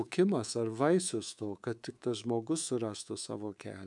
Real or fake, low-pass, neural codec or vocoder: fake; 10.8 kHz; codec, 24 kHz, 3.1 kbps, DualCodec